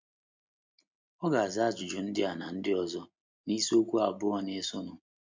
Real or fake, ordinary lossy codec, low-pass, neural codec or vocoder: real; MP3, 64 kbps; 7.2 kHz; none